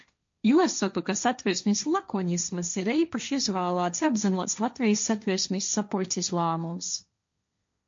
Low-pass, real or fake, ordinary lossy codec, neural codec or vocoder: 7.2 kHz; fake; MP3, 48 kbps; codec, 16 kHz, 1.1 kbps, Voila-Tokenizer